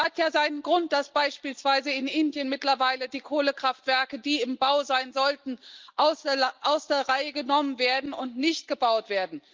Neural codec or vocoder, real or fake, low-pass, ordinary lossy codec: none; real; 7.2 kHz; Opus, 24 kbps